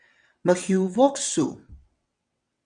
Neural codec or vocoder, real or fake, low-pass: vocoder, 22.05 kHz, 80 mel bands, WaveNeXt; fake; 9.9 kHz